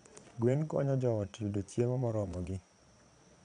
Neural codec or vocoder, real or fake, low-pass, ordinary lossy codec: vocoder, 22.05 kHz, 80 mel bands, Vocos; fake; 9.9 kHz; none